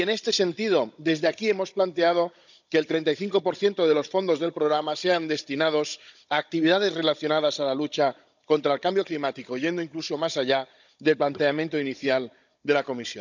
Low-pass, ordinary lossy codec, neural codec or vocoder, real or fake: 7.2 kHz; none; codec, 16 kHz, 16 kbps, FunCodec, trained on Chinese and English, 50 frames a second; fake